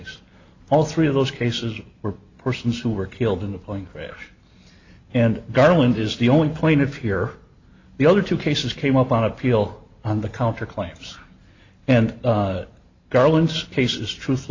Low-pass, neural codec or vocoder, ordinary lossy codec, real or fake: 7.2 kHz; none; AAC, 48 kbps; real